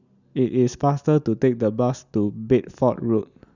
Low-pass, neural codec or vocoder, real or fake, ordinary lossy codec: 7.2 kHz; none; real; none